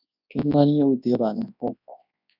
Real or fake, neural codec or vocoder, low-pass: fake; codec, 24 kHz, 0.9 kbps, WavTokenizer, large speech release; 5.4 kHz